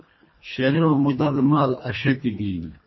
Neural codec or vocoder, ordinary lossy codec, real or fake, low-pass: codec, 24 kHz, 1.5 kbps, HILCodec; MP3, 24 kbps; fake; 7.2 kHz